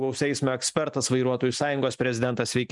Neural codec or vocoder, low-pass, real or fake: none; 10.8 kHz; real